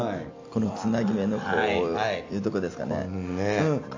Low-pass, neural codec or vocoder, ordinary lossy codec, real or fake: 7.2 kHz; none; AAC, 48 kbps; real